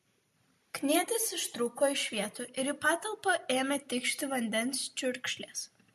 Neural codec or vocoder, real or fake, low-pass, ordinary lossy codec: none; real; 14.4 kHz; MP3, 64 kbps